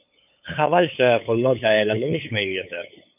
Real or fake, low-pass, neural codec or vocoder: fake; 3.6 kHz; codec, 16 kHz, 8 kbps, FunCodec, trained on LibriTTS, 25 frames a second